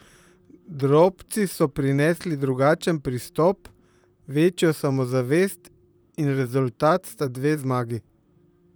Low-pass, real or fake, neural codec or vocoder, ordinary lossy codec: none; fake; vocoder, 44.1 kHz, 128 mel bands, Pupu-Vocoder; none